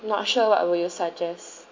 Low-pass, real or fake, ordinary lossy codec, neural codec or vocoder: 7.2 kHz; real; MP3, 64 kbps; none